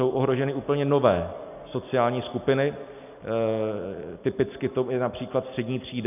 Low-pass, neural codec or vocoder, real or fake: 3.6 kHz; none; real